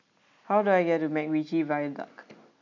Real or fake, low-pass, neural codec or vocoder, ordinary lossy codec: real; 7.2 kHz; none; MP3, 64 kbps